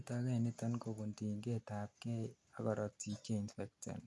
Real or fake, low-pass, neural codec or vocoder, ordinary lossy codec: real; none; none; none